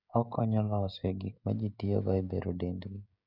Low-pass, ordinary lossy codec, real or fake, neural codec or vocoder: 5.4 kHz; none; fake; codec, 16 kHz, 16 kbps, FreqCodec, smaller model